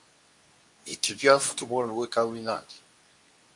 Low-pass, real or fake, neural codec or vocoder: 10.8 kHz; fake; codec, 24 kHz, 0.9 kbps, WavTokenizer, medium speech release version 2